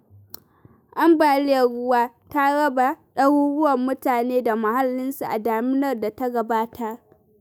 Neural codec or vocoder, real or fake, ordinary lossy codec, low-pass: autoencoder, 48 kHz, 128 numbers a frame, DAC-VAE, trained on Japanese speech; fake; none; none